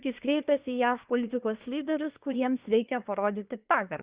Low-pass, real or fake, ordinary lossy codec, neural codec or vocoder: 3.6 kHz; fake; Opus, 64 kbps; codec, 24 kHz, 1 kbps, SNAC